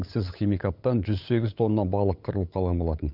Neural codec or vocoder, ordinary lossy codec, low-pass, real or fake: codec, 16 kHz, 8 kbps, FunCodec, trained on LibriTTS, 25 frames a second; none; 5.4 kHz; fake